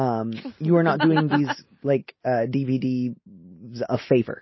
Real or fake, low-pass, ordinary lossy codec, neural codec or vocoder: real; 7.2 kHz; MP3, 24 kbps; none